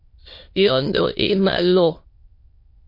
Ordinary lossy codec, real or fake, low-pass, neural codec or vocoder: MP3, 32 kbps; fake; 5.4 kHz; autoencoder, 22.05 kHz, a latent of 192 numbers a frame, VITS, trained on many speakers